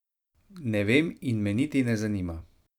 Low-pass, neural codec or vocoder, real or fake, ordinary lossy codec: 19.8 kHz; vocoder, 44.1 kHz, 128 mel bands every 256 samples, BigVGAN v2; fake; none